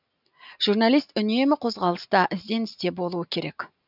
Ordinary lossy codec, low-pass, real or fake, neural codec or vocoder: none; 5.4 kHz; real; none